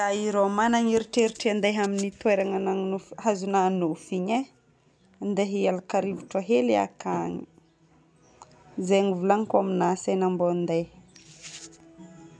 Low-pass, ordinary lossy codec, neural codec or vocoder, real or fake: none; none; none; real